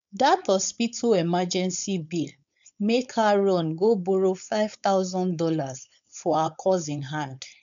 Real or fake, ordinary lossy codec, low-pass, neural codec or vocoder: fake; none; 7.2 kHz; codec, 16 kHz, 4.8 kbps, FACodec